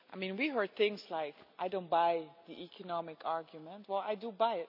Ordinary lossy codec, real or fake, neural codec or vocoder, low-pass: none; real; none; 5.4 kHz